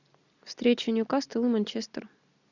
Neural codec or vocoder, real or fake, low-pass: none; real; 7.2 kHz